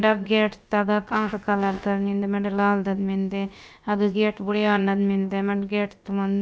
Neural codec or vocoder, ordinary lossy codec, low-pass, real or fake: codec, 16 kHz, about 1 kbps, DyCAST, with the encoder's durations; none; none; fake